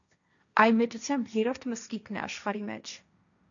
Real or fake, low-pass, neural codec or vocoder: fake; 7.2 kHz; codec, 16 kHz, 1.1 kbps, Voila-Tokenizer